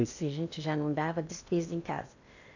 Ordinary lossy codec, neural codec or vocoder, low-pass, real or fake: none; codec, 16 kHz in and 24 kHz out, 0.8 kbps, FocalCodec, streaming, 65536 codes; 7.2 kHz; fake